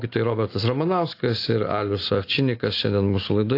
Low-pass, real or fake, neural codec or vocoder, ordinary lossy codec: 5.4 kHz; real; none; AAC, 32 kbps